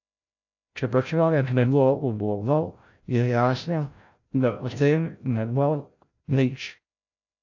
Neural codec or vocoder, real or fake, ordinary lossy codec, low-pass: codec, 16 kHz, 0.5 kbps, FreqCodec, larger model; fake; AAC, 48 kbps; 7.2 kHz